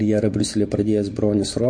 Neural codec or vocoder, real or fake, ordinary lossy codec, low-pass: none; real; MP3, 48 kbps; 9.9 kHz